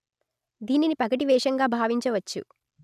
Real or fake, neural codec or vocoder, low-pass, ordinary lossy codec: real; none; 14.4 kHz; none